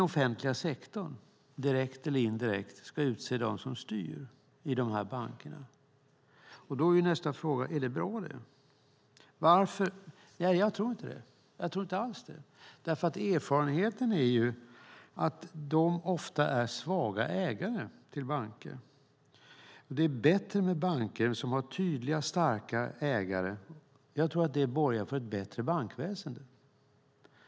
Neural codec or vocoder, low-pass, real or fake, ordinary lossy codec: none; none; real; none